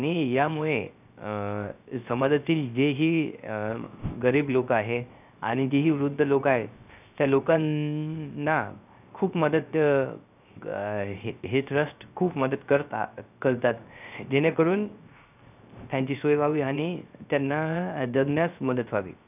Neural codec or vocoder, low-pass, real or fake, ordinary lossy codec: codec, 16 kHz, 0.3 kbps, FocalCodec; 3.6 kHz; fake; none